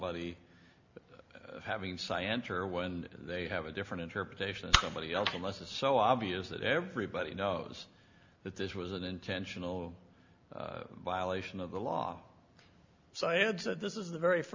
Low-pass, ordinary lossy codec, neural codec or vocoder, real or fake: 7.2 kHz; MP3, 48 kbps; none; real